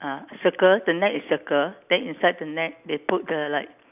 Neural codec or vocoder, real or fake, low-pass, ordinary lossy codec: none; real; 3.6 kHz; none